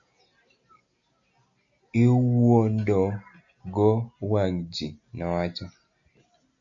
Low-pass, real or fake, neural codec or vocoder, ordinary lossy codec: 7.2 kHz; real; none; MP3, 64 kbps